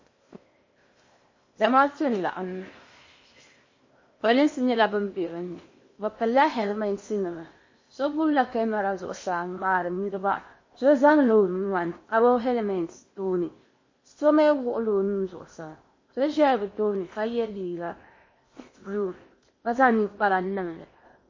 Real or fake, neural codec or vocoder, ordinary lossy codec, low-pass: fake; codec, 16 kHz in and 24 kHz out, 0.8 kbps, FocalCodec, streaming, 65536 codes; MP3, 32 kbps; 7.2 kHz